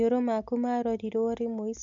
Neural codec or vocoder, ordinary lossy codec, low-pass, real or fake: none; MP3, 64 kbps; 7.2 kHz; real